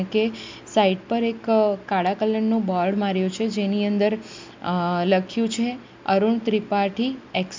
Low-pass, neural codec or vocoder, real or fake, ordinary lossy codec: 7.2 kHz; none; real; MP3, 64 kbps